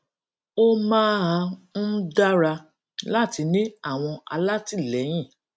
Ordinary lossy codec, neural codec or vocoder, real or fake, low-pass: none; none; real; none